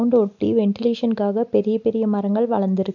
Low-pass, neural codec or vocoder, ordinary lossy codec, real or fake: 7.2 kHz; none; none; real